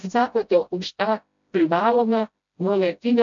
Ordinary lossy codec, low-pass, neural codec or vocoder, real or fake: MP3, 64 kbps; 7.2 kHz; codec, 16 kHz, 0.5 kbps, FreqCodec, smaller model; fake